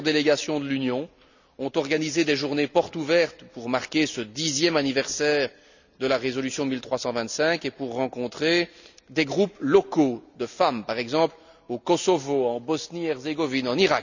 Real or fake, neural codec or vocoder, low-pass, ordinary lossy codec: real; none; 7.2 kHz; none